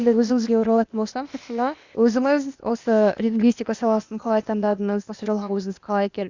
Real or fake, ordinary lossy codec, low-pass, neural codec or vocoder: fake; Opus, 64 kbps; 7.2 kHz; codec, 16 kHz, 0.8 kbps, ZipCodec